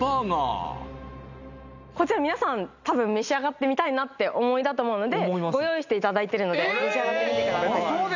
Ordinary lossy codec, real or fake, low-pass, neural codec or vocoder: none; real; 7.2 kHz; none